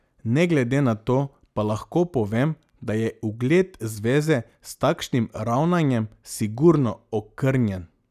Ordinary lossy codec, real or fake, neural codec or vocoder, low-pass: none; real; none; 14.4 kHz